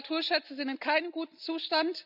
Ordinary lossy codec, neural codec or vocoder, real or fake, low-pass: none; none; real; 5.4 kHz